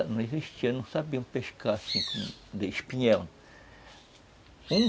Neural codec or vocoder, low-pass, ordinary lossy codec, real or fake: none; none; none; real